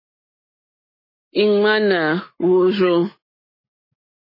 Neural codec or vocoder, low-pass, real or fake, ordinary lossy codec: none; 5.4 kHz; real; MP3, 24 kbps